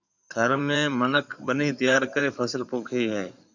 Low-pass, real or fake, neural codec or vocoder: 7.2 kHz; fake; codec, 16 kHz in and 24 kHz out, 2.2 kbps, FireRedTTS-2 codec